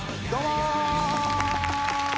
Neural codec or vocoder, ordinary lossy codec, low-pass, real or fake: none; none; none; real